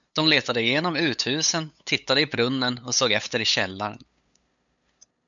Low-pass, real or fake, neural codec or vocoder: 7.2 kHz; fake; codec, 16 kHz, 8 kbps, FunCodec, trained on LibriTTS, 25 frames a second